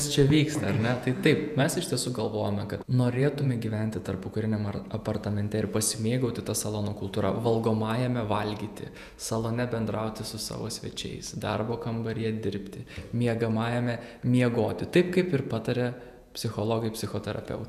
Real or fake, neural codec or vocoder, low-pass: fake; vocoder, 48 kHz, 128 mel bands, Vocos; 14.4 kHz